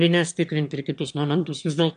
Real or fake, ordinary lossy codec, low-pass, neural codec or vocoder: fake; MP3, 64 kbps; 9.9 kHz; autoencoder, 22.05 kHz, a latent of 192 numbers a frame, VITS, trained on one speaker